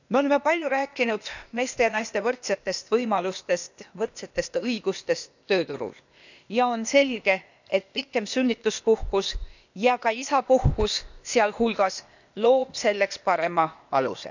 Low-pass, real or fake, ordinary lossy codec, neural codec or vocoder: 7.2 kHz; fake; none; codec, 16 kHz, 0.8 kbps, ZipCodec